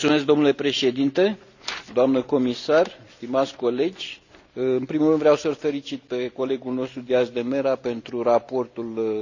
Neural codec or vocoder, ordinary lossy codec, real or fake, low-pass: none; none; real; 7.2 kHz